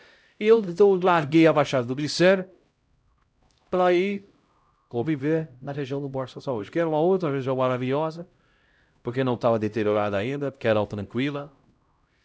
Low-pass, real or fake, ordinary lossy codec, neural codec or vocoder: none; fake; none; codec, 16 kHz, 0.5 kbps, X-Codec, HuBERT features, trained on LibriSpeech